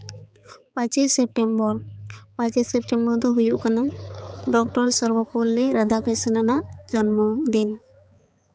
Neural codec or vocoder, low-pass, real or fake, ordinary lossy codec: codec, 16 kHz, 4 kbps, X-Codec, HuBERT features, trained on balanced general audio; none; fake; none